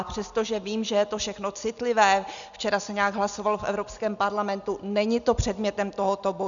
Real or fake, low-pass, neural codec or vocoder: real; 7.2 kHz; none